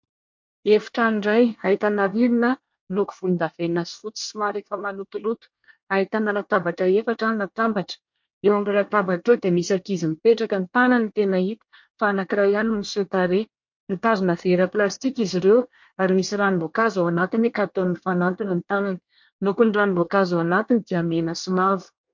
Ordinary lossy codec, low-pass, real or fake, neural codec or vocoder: MP3, 48 kbps; 7.2 kHz; fake; codec, 24 kHz, 1 kbps, SNAC